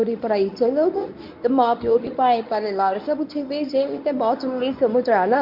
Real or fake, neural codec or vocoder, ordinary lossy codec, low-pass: fake; codec, 24 kHz, 0.9 kbps, WavTokenizer, medium speech release version 1; MP3, 48 kbps; 5.4 kHz